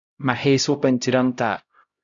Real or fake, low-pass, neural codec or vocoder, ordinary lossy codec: fake; 7.2 kHz; codec, 16 kHz, 0.5 kbps, X-Codec, HuBERT features, trained on LibriSpeech; Opus, 64 kbps